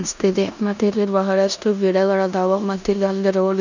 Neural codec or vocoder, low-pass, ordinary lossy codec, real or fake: codec, 16 kHz in and 24 kHz out, 0.9 kbps, LongCat-Audio-Codec, four codebook decoder; 7.2 kHz; none; fake